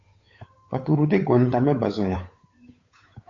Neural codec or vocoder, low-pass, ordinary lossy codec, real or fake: codec, 16 kHz, 8 kbps, FunCodec, trained on Chinese and English, 25 frames a second; 7.2 kHz; AAC, 32 kbps; fake